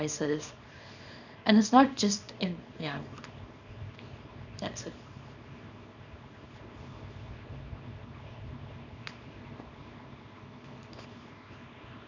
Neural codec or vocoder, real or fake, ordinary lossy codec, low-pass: codec, 24 kHz, 0.9 kbps, WavTokenizer, small release; fake; none; 7.2 kHz